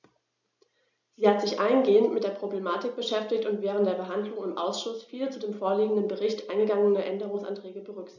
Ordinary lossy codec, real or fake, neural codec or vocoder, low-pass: none; real; none; 7.2 kHz